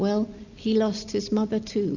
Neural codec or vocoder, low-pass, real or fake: none; 7.2 kHz; real